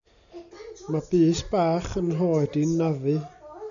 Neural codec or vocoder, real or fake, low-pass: none; real; 7.2 kHz